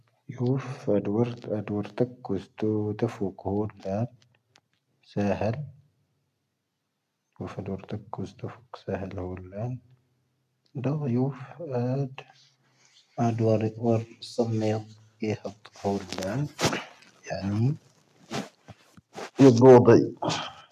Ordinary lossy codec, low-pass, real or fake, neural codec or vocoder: none; 14.4 kHz; real; none